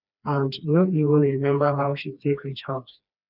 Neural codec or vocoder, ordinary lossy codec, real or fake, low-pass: codec, 16 kHz, 2 kbps, FreqCodec, smaller model; none; fake; 5.4 kHz